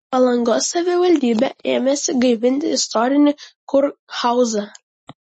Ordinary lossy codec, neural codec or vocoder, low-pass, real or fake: MP3, 32 kbps; none; 10.8 kHz; real